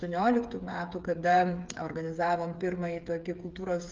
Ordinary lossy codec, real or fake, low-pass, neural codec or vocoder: Opus, 32 kbps; fake; 7.2 kHz; codec, 16 kHz, 8 kbps, FreqCodec, smaller model